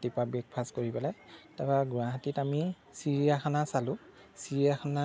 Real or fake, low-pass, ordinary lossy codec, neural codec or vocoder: real; none; none; none